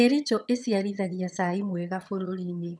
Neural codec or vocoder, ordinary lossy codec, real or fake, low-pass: vocoder, 22.05 kHz, 80 mel bands, HiFi-GAN; none; fake; none